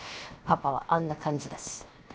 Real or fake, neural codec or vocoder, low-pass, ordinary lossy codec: fake; codec, 16 kHz, 0.7 kbps, FocalCodec; none; none